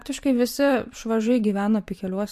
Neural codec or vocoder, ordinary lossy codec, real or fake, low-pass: vocoder, 44.1 kHz, 128 mel bands every 512 samples, BigVGAN v2; MP3, 64 kbps; fake; 14.4 kHz